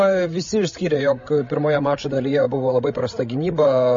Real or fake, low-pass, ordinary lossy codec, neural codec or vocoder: fake; 7.2 kHz; MP3, 32 kbps; codec, 16 kHz, 16 kbps, FreqCodec, larger model